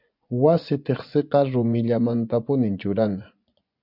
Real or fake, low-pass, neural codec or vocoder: fake; 5.4 kHz; vocoder, 24 kHz, 100 mel bands, Vocos